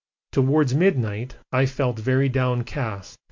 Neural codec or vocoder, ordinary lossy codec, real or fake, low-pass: none; MP3, 48 kbps; real; 7.2 kHz